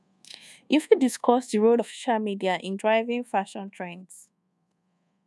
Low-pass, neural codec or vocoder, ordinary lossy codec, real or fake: none; codec, 24 kHz, 1.2 kbps, DualCodec; none; fake